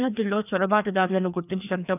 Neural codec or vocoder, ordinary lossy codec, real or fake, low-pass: codec, 16 kHz, 2 kbps, FreqCodec, larger model; none; fake; 3.6 kHz